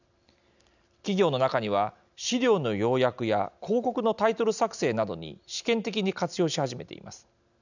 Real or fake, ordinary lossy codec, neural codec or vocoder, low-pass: real; none; none; 7.2 kHz